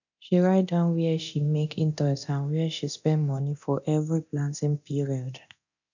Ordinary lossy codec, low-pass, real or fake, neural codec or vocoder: none; 7.2 kHz; fake; codec, 24 kHz, 0.9 kbps, DualCodec